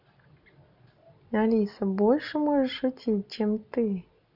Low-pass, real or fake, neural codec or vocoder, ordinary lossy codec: 5.4 kHz; real; none; none